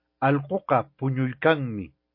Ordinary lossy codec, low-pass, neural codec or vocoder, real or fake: MP3, 32 kbps; 5.4 kHz; none; real